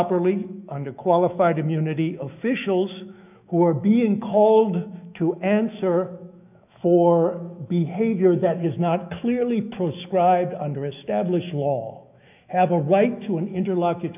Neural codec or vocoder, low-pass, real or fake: autoencoder, 48 kHz, 128 numbers a frame, DAC-VAE, trained on Japanese speech; 3.6 kHz; fake